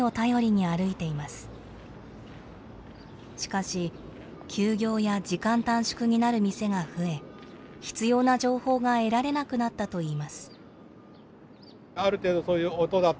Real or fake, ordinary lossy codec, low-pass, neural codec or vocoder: real; none; none; none